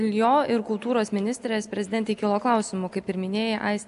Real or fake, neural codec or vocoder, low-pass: real; none; 10.8 kHz